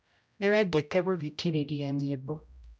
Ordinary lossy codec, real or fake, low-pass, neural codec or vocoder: none; fake; none; codec, 16 kHz, 0.5 kbps, X-Codec, HuBERT features, trained on general audio